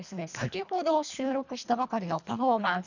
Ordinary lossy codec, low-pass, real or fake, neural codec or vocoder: none; 7.2 kHz; fake; codec, 24 kHz, 1.5 kbps, HILCodec